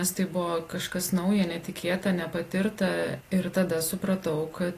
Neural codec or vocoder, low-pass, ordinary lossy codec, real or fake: none; 14.4 kHz; AAC, 48 kbps; real